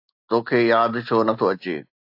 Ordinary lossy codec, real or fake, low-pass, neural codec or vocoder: AAC, 48 kbps; real; 5.4 kHz; none